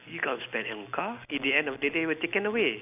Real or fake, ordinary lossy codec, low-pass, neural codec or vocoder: real; none; 3.6 kHz; none